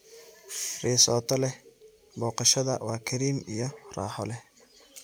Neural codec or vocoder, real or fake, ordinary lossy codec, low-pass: none; real; none; none